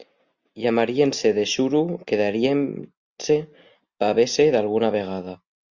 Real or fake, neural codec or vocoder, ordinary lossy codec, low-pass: real; none; Opus, 64 kbps; 7.2 kHz